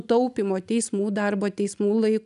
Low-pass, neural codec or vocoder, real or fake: 10.8 kHz; none; real